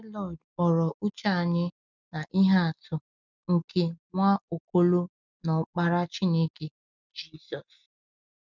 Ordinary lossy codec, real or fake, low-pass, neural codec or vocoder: none; real; 7.2 kHz; none